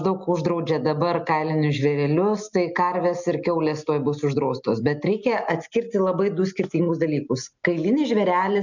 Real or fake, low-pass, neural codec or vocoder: real; 7.2 kHz; none